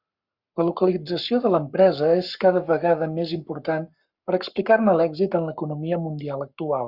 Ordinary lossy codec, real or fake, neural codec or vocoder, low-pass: Opus, 64 kbps; fake; codec, 44.1 kHz, 7.8 kbps, Pupu-Codec; 5.4 kHz